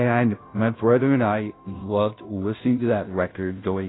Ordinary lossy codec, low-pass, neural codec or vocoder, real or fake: AAC, 16 kbps; 7.2 kHz; codec, 16 kHz, 0.5 kbps, FunCodec, trained on Chinese and English, 25 frames a second; fake